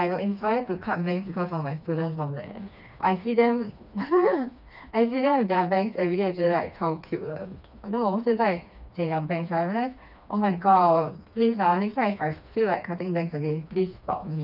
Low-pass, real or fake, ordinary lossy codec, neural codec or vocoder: 5.4 kHz; fake; none; codec, 16 kHz, 2 kbps, FreqCodec, smaller model